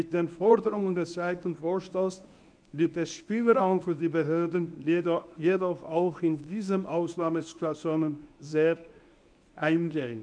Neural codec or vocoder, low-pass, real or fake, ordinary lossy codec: codec, 24 kHz, 0.9 kbps, WavTokenizer, medium speech release version 1; 9.9 kHz; fake; none